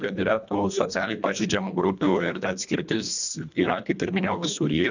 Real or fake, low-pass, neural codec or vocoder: fake; 7.2 kHz; codec, 24 kHz, 1.5 kbps, HILCodec